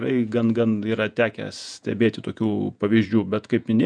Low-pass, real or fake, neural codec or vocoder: 9.9 kHz; fake; vocoder, 48 kHz, 128 mel bands, Vocos